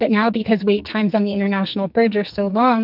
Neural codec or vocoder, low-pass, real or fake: codec, 44.1 kHz, 2.6 kbps, SNAC; 5.4 kHz; fake